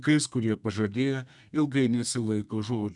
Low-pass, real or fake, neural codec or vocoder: 10.8 kHz; fake; codec, 32 kHz, 1.9 kbps, SNAC